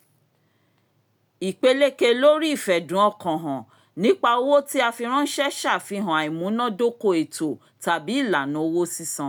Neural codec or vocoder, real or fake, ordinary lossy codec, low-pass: none; real; none; none